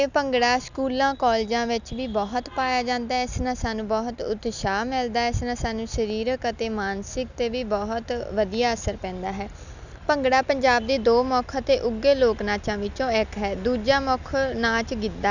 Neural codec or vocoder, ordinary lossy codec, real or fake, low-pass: none; none; real; 7.2 kHz